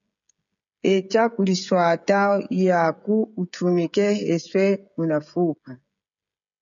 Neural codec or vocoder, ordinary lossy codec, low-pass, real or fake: codec, 16 kHz, 16 kbps, FreqCodec, smaller model; MP3, 96 kbps; 7.2 kHz; fake